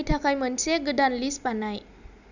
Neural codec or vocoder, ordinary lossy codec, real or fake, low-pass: none; none; real; 7.2 kHz